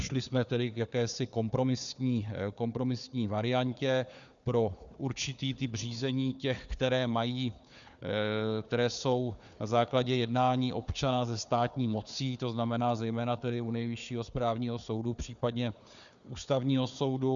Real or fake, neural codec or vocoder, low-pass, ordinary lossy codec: fake; codec, 16 kHz, 4 kbps, FunCodec, trained on Chinese and English, 50 frames a second; 7.2 kHz; MP3, 96 kbps